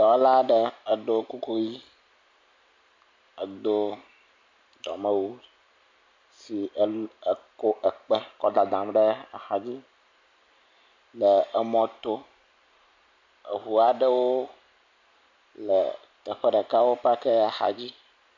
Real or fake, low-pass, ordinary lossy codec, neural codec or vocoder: real; 7.2 kHz; MP3, 48 kbps; none